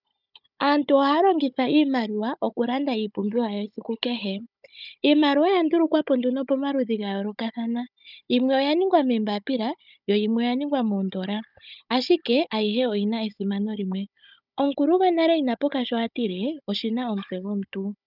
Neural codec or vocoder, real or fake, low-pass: codec, 16 kHz, 16 kbps, FunCodec, trained on Chinese and English, 50 frames a second; fake; 5.4 kHz